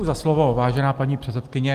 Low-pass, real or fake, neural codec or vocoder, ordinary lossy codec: 14.4 kHz; real; none; Opus, 24 kbps